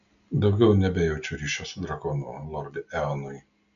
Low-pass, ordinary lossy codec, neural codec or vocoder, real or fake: 7.2 kHz; MP3, 96 kbps; none; real